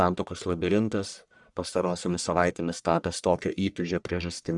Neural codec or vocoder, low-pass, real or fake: codec, 44.1 kHz, 1.7 kbps, Pupu-Codec; 10.8 kHz; fake